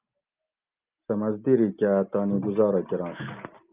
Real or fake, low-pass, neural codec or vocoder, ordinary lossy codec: real; 3.6 kHz; none; Opus, 24 kbps